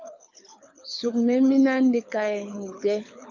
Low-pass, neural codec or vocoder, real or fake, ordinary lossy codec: 7.2 kHz; codec, 24 kHz, 6 kbps, HILCodec; fake; MP3, 48 kbps